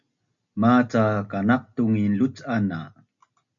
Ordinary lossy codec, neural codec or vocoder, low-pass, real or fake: MP3, 64 kbps; none; 7.2 kHz; real